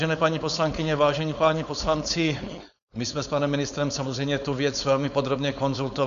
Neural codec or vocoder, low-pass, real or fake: codec, 16 kHz, 4.8 kbps, FACodec; 7.2 kHz; fake